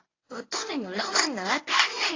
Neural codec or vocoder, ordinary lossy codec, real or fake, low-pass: codec, 24 kHz, 0.9 kbps, WavTokenizer, medium speech release version 1; AAC, 32 kbps; fake; 7.2 kHz